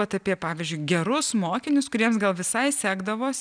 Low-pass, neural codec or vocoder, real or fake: 9.9 kHz; none; real